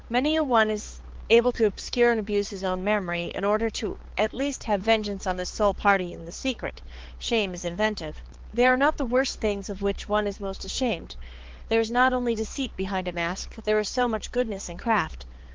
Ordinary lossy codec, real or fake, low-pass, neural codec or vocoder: Opus, 16 kbps; fake; 7.2 kHz; codec, 16 kHz, 4 kbps, X-Codec, HuBERT features, trained on balanced general audio